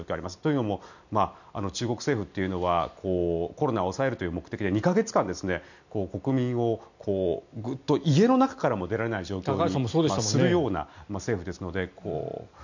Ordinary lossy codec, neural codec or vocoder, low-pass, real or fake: none; none; 7.2 kHz; real